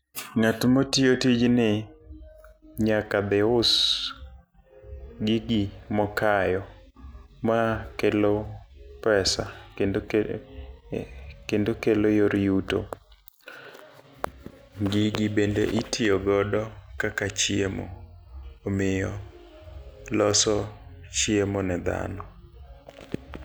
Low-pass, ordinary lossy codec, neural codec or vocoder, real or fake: none; none; none; real